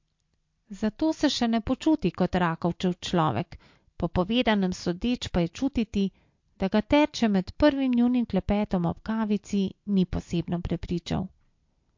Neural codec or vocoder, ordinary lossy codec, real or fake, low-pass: none; MP3, 48 kbps; real; 7.2 kHz